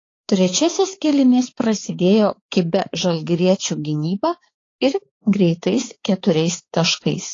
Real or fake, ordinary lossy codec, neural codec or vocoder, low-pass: fake; AAC, 32 kbps; codec, 16 kHz, 4 kbps, X-Codec, HuBERT features, trained on balanced general audio; 7.2 kHz